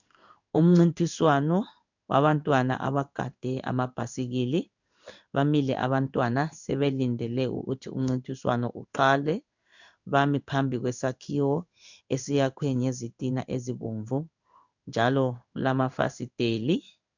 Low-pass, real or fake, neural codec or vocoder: 7.2 kHz; fake; codec, 16 kHz in and 24 kHz out, 1 kbps, XY-Tokenizer